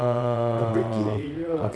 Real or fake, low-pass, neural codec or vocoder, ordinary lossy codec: fake; none; vocoder, 22.05 kHz, 80 mel bands, WaveNeXt; none